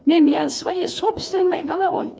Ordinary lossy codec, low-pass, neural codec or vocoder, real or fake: none; none; codec, 16 kHz, 2 kbps, FreqCodec, larger model; fake